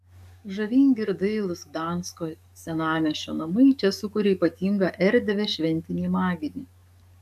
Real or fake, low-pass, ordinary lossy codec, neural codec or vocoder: fake; 14.4 kHz; AAC, 96 kbps; codec, 44.1 kHz, 7.8 kbps, DAC